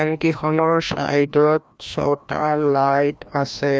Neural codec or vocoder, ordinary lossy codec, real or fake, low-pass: codec, 16 kHz, 1 kbps, FreqCodec, larger model; none; fake; none